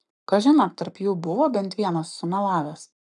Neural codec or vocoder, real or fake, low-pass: codec, 44.1 kHz, 7.8 kbps, Pupu-Codec; fake; 10.8 kHz